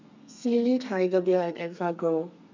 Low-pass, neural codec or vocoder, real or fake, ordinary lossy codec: 7.2 kHz; codec, 32 kHz, 1.9 kbps, SNAC; fake; MP3, 64 kbps